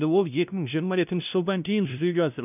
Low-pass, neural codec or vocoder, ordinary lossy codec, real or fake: 3.6 kHz; codec, 16 kHz, 0.5 kbps, FunCodec, trained on LibriTTS, 25 frames a second; none; fake